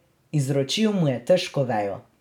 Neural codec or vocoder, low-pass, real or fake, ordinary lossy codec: none; 19.8 kHz; real; none